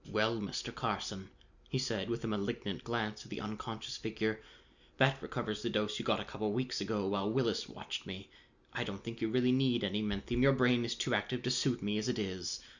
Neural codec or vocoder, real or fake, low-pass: none; real; 7.2 kHz